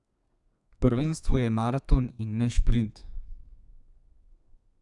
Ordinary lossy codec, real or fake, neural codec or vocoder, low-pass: none; fake; codec, 32 kHz, 1.9 kbps, SNAC; 10.8 kHz